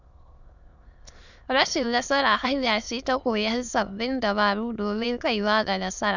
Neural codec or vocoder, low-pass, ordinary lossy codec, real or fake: autoencoder, 22.05 kHz, a latent of 192 numbers a frame, VITS, trained on many speakers; 7.2 kHz; none; fake